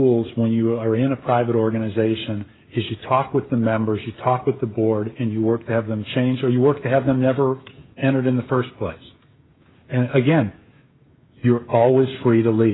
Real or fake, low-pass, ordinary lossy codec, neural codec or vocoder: fake; 7.2 kHz; AAC, 16 kbps; codec, 16 kHz, 16 kbps, FreqCodec, smaller model